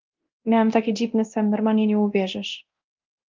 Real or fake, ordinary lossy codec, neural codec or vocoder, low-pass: fake; Opus, 32 kbps; codec, 24 kHz, 0.9 kbps, DualCodec; 7.2 kHz